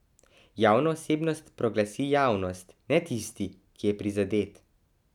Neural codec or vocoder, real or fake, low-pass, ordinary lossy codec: none; real; 19.8 kHz; none